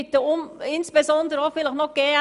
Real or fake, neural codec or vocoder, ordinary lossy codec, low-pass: real; none; none; 10.8 kHz